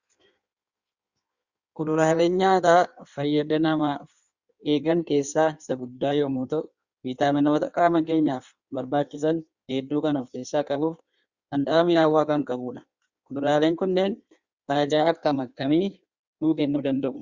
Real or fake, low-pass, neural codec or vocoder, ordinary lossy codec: fake; 7.2 kHz; codec, 16 kHz in and 24 kHz out, 1.1 kbps, FireRedTTS-2 codec; Opus, 64 kbps